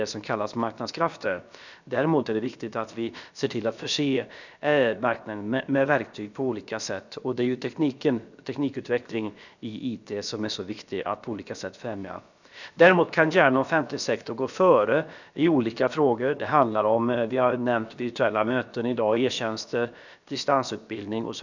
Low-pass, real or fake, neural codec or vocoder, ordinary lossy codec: 7.2 kHz; fake; codec, 16 kHz, about 1 kbps, DyCAST, with the encoder's durations; none